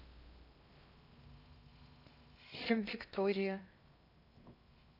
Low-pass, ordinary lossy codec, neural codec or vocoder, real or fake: 5.4 kHz; none; codec, 16 kHz in and 24 kHz out, 0.6 kbps, FocalCodec, streaming, 2048 codes; fake